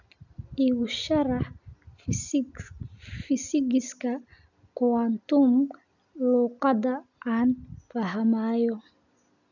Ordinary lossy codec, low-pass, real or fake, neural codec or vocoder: none; 7.2 kHz; real; none